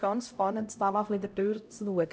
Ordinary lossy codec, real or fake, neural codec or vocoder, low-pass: none; fake; codec, 16 kHz, 0.5 kbps, X-Codec, HuBERT features, trained on LibriSpeech; none